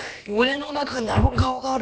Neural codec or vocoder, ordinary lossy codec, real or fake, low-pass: codec, 16 kHz, about 1 kbps, DyCAST, with the encoder's durations; none; fake; none